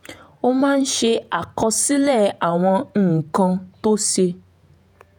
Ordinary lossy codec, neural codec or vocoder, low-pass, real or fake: none; vocoder, 48 kHz, 128 mel bands, Vocos; none; fake